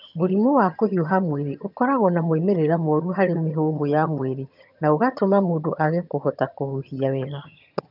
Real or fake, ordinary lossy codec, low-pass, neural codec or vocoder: fake; none; 5.4 kHz; vocoder, 22.05 kHz, 80 mel bands, HiFi-GAN